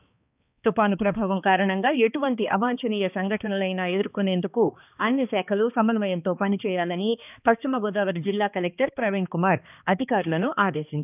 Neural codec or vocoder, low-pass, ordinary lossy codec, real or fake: codec, 16 kHz, 2 kbps, X-Codec, HuBERT features, trained on balanced general audio; 3.6 kHz; none; fake